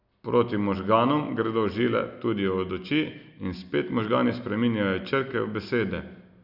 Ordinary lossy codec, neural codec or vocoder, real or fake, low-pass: none; none; real; 5.4 kHz